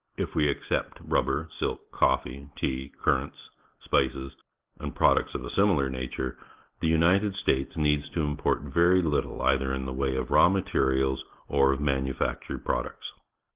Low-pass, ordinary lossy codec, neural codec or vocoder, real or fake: 3.6 kHz; Opus, 16 kbps; none; real